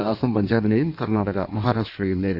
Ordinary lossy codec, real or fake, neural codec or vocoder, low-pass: none; fake; codec, 16 kHz in and 24 kHz out, 1.1 kbps, FireRedTTS-2 codec; 5.4 kHz